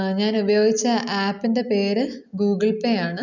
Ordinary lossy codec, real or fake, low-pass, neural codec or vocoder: none; real; 7.2 kHz; none